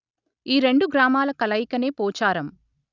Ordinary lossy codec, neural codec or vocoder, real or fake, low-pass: none; none; real; 7.2 kHz